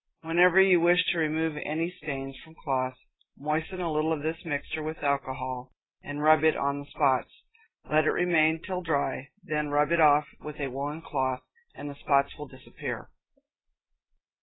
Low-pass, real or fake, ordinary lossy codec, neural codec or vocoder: 7.2 kHz; real; AAC, 16 kbps; none